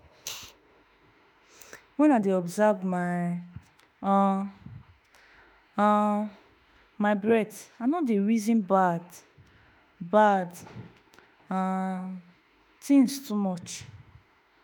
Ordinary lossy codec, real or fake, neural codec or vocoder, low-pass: none; fake; autoencoder, 48 kHz, 32 numbers a frame, DAC-VAE, trained on Japanese speech; none